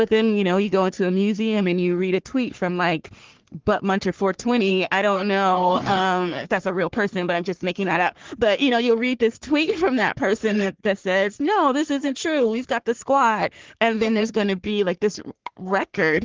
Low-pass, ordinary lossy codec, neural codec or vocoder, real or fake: 7.2 kHz; Opus, 16 kbps; codec, 44.1 kHz, 3.4 kbps, Pupu-Codec; fake